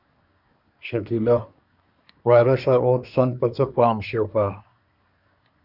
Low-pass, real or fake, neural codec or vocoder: 5.4 kHz; fake; codec, 24 kHz, 1 kbps, SNAC